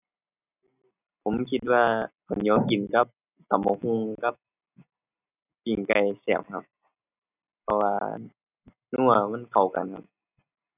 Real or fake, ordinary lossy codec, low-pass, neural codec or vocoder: real; none; 3.6 kHz; none